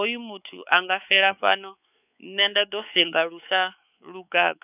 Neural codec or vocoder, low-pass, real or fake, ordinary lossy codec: codec, 16 kHz, 4 kbps, X-Codec, WavLM features, trained on Multilingual LibriSpeech; 3.6 kHz; fake; none